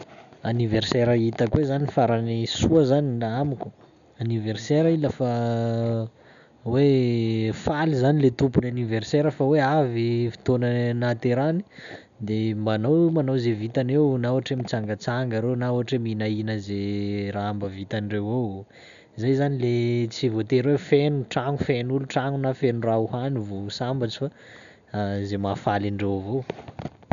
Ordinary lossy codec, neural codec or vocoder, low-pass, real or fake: none; none; 7.2 kHz; real